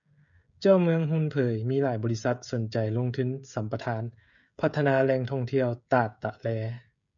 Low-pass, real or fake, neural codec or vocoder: 7.2 kHz; fake; codec, 16 kHz, 16 kbps, FreqCodec, smaller model